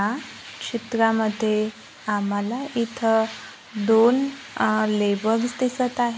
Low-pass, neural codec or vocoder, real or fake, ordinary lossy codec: none; none; real; none